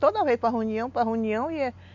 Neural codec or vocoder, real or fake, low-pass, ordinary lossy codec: none; real; 7.2 kHz; none